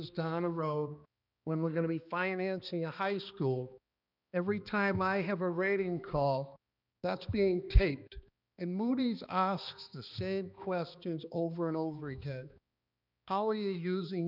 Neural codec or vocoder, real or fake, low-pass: codec, 16 kHz, 2 kbps, X-Codec, HuBERT features, trained on balanced general audio; fake; 5.4 kHz